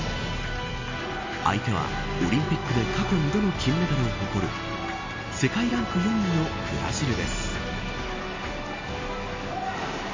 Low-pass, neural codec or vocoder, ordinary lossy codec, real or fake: 7.2 kHz; none; MP3, 48 kbps; real